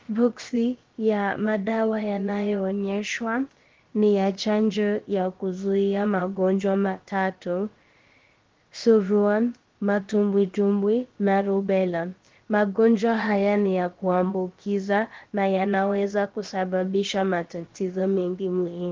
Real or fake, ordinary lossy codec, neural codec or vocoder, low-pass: fake; Opus, 16 kbps; codec, 16 kHz, about 1 kbps, DyCAST, with the encoder's durations; 7.2 kHz